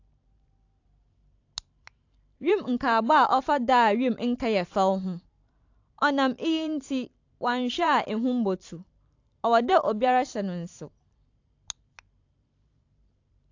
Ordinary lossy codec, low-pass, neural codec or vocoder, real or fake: AAC, 48 kbps; 7.2 kHz; vocoder, 44.1 kHz, 128 mel bands every 512 samples, BigVGAN v2; fake